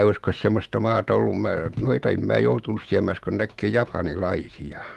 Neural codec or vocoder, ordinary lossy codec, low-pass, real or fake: autoencoder, 48 kHz, 128 numbers a frame, DAC-VAE, trained on Japanese speech; Opus, 24 kbps; 14.4 kHz; fake